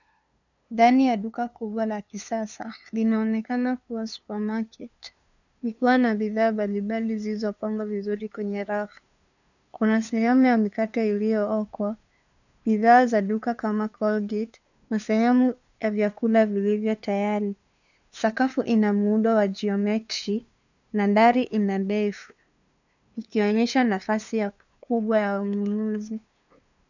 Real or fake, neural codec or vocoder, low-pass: fake; codec, 16 kHz, 2 kbps, FunCodec, trained on LibriTTS, 25 frames a second; 7.2 kHz